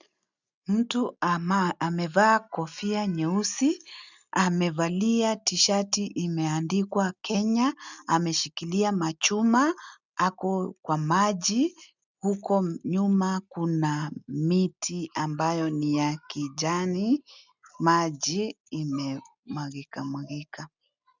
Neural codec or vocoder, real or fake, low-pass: none; real; 7.2 kHz